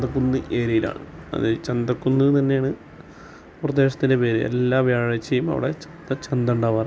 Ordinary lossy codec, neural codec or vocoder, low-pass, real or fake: none; none; none; real